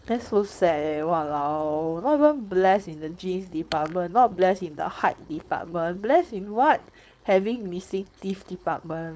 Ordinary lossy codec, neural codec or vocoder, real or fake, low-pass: none; codec, 16 kHz, 4.8 kbps, FACodec; fake; none